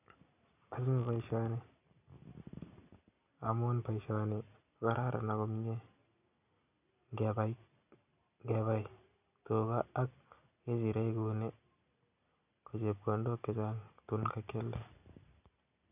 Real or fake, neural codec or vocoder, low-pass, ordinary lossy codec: real; none; 3.6 kHz; none